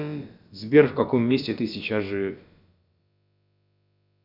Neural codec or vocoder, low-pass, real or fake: codec, 16 kHz, about 1 kbps, DyCAST, with the encoder's durations; 5.4 kHz; fake